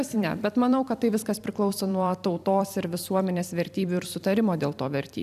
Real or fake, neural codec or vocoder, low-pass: fake; vocoder, 44.1 kHz, 128 mel bands every 256 samples, BigVGAN v2; 14.4 kHz